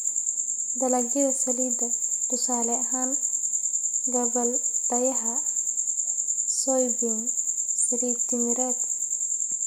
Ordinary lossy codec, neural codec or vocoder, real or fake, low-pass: none; none; real; none